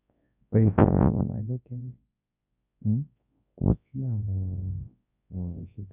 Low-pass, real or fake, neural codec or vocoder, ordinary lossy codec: 3.6 kHz; fake; codec, 24 kHz, 0.9 kbps, WavTokenizer, large speech release; none